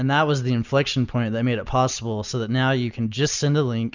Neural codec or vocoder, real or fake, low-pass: none; real; 7.2 kHz